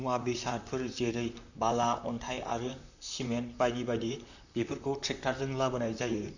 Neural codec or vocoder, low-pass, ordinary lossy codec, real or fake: vocoder, 44.1 kHz, 128 mel bands, Pupu-Vocoder; 7.2 kHz; none; fake